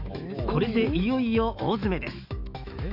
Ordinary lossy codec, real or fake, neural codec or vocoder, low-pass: none; fake; autoencoder, 48 kHz, 128 numbers a frame, DAC-VAE, trained on Japanese speech; 5.4 kHz